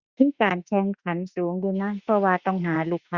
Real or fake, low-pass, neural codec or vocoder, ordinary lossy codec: fake; 7.2 kHz; autoencoder, 48 kHz, 32 numbers a frame, DAC-VAE, trained on Japanese speech; Opus, 64 kbps